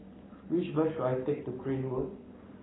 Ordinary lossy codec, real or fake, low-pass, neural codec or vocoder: AAC, 16 kbps; fake; 7.2 kHz; codec, 44.1 kHz, 7.8 kbps, Pupu-Codec